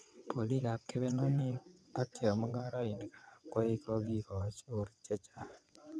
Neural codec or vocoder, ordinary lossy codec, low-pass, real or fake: vocoder, 22.05 kHz, 80 mel bands, WaveNeXt; none; none; fake